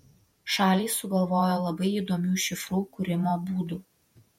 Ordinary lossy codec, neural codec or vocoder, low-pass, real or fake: MP3, 64 kbps; vocoder, 48 kHz, 128 mel bands, Vocos; 19.8 kHz; fake